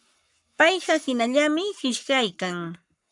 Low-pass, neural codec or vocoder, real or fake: 10.8 kHz; codec, 44.1 kHz, 7.8 kbps, Pupu-Codec; fake